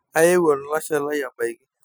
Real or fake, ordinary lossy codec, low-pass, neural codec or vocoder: real; none; none; none